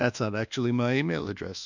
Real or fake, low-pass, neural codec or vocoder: fake; 7.2 kHz; codec, 24 kHz, 1.2 kbps, DualCodec